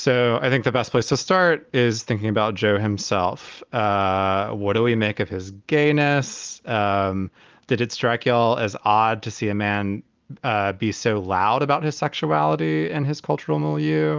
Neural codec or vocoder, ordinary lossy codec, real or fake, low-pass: none; Opus, 24 kbps; real; 7.2 kHz